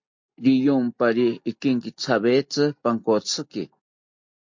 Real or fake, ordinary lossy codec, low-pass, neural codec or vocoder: real; MP3, 48 kbps; 7.2 kHz; none